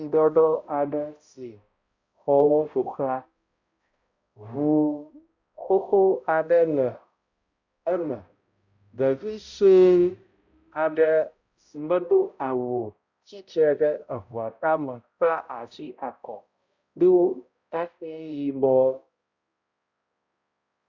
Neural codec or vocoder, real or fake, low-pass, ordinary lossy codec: codec, 16 kHz, 0.5 kbps, X-Codec, HuBERT features, trained on balanced general audio; fake; 7.2 kHz; Opus, 64 kbps